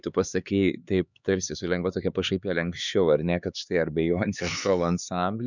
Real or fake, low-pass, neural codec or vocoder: fake; 7.2 kHz; codec, 16 kHz, 4 kbps, X-Codec, HuBERT features, trained on LibriSpeech